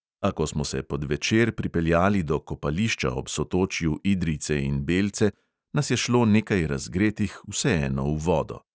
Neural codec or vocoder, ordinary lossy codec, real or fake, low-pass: none; none; real; none